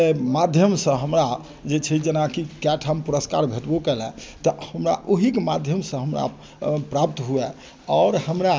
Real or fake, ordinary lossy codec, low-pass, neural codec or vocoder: real; none; none; none